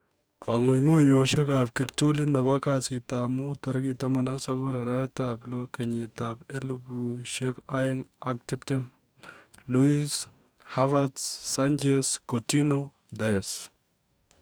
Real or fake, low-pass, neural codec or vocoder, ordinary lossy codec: fake; none; codec, 44.1 kHz, 2.6 kbps, DAC; none